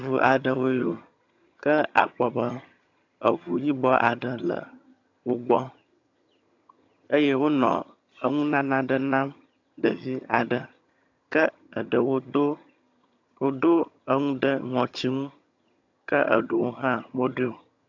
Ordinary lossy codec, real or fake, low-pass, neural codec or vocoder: AAC, 48 kbps; fake; 7.2 kHz; vocoder, 22.05 kHz, 80 mel bands, HiFi-GAN